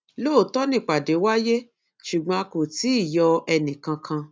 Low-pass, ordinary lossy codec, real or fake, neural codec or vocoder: none; none; real; none